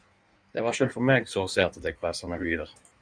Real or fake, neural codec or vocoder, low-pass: fake; codec, 16 kHz in and 24 kHz out, 1.1 kbps, FireRedTTS-2 codec; 9.9 kHz